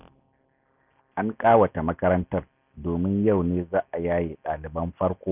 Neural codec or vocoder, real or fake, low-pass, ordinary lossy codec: none; real; 3.6 kHz; none